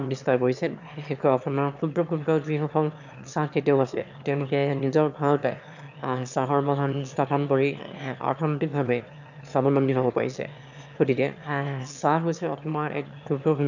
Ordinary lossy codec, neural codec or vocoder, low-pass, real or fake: none; autoencoder, 22.05 kHz, a latent of 192 numbers a frame, VITS, trained on one speaker; 7.2 kHz; fake